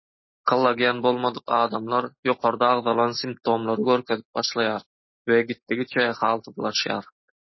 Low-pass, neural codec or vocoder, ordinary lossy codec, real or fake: 7.2 kHz; none; MP3, 24 kbps; real